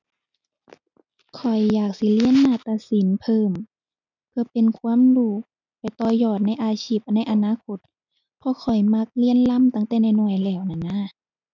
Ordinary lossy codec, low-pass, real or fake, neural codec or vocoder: none; 7.2 kHz; real; none